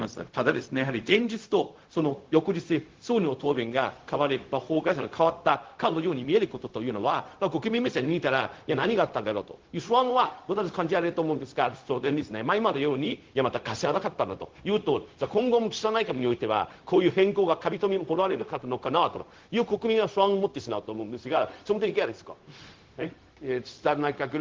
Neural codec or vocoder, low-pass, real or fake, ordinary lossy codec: codec, 16 kHz, 0.4 kbps, LongCat-Audio-Codec; 7.2 kHz; fake; Opus, 16 kbps